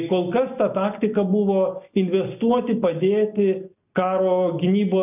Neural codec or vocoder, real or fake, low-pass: none; real; 3.6 kHz